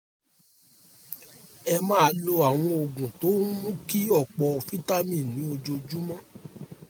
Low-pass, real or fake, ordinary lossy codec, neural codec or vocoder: none; real; none; none